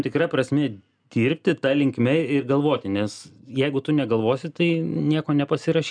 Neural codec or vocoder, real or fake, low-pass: none; real; 9.9 kHz